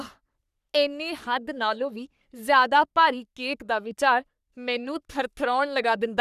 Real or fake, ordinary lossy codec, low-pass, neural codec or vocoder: fake; none; 14.4 kHz; codec, 44.1 kHz, 3.4 kbps, Pupu-Codec